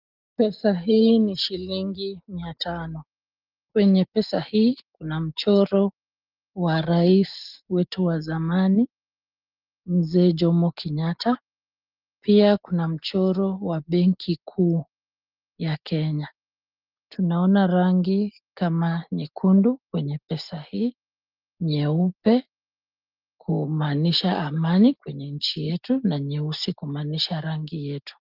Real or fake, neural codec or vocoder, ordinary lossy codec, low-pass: real; none; Opus, 32 kbps; 5.4 kHz